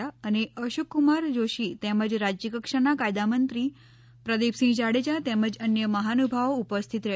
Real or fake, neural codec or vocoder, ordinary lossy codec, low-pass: real; none; none; none